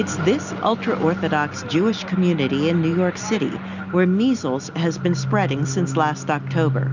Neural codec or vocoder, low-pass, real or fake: none; 7.2 kHz; real